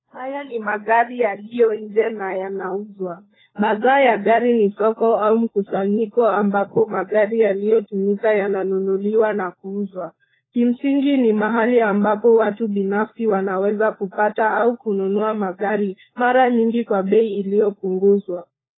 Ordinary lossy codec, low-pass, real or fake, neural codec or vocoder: AAC, 16 kbps; 7.2 kHz; fake; codec, 16 kHz, 4 kbps, FunCodec, trained on LibriTTS, 50 frames a second